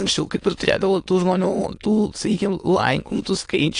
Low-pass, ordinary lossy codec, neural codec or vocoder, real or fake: 9.9 kHz; AAC, 48 kbps; autoencoder, 22.05 kHz, a latent of 192 numbers a frame, VITS, trained on many speakers; fake